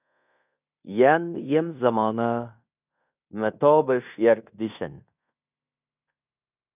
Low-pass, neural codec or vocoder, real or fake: 3.6 kHz; codec, 16 kHz in and 24 kHz out, 0.9 kbps, LongCat-Audio-Codec, fine tuned four codebook decoder; fake